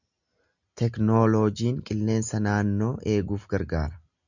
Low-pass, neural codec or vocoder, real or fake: 7.2 kHz; none; real